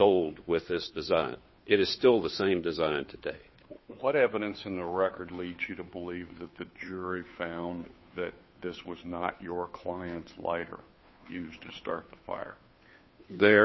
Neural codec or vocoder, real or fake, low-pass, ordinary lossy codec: codec, 16 kHz, 2 kbps, FunCodec, trained on Chinese and English, 25 frames a second; fake; 7.2 kHz; MP3, 24 kbps